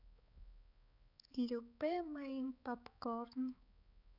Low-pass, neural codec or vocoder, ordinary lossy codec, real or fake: 5.4 kHz; codec, 16 kHz, 4 kbps, X-Codec, HuBERT features, trained on balanced general audio; none; fake